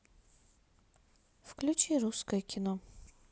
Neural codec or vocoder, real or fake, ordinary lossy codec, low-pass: none; real; none; none